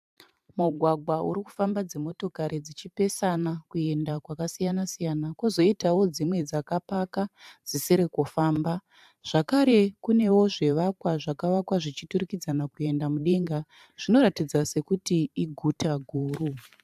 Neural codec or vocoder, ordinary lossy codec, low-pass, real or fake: vocoder, 44.1 kHz, 128 mel bands every 512 samples, BigVGAN v2; AAC, 96 kbps; 14.4 kHz; fake